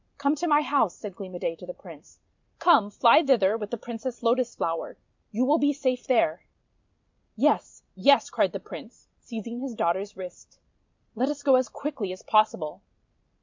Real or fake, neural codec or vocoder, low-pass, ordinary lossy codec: real; none; 7.2 kHz; MP3, 64 kbps